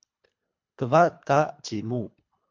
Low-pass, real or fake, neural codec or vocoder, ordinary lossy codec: 7.2 kHz; fake; codec, 24 kHz, 3 kbps, HILCodec; MP3, 64 kbps